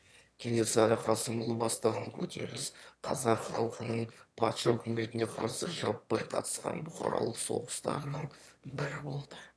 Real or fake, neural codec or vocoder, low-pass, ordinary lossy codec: fake; autoencoder, 22.05 kHz, a latent of 192 numbers a frame, VITS, trained on one speaker; none; none